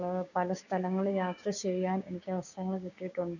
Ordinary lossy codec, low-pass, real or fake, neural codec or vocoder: none; 7.2 kHz; fake; codec, 16 kHz, 6 kbps, DAC